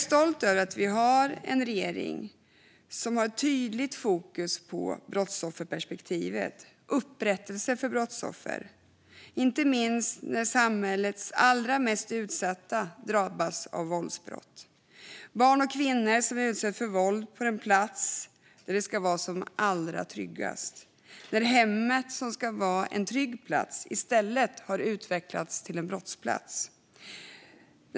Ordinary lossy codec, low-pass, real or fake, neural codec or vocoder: none; none; real; none